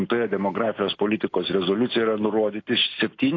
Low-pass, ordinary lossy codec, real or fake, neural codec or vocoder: 7.2 kHz; AAC, 32 kbps; real; none